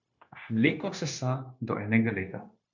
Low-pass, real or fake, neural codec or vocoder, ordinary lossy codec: 7.2 kHz; fake; codec, 16 kHz, 0.9 kbps, LongCat-Audio-Codec; MP3, 64 kbps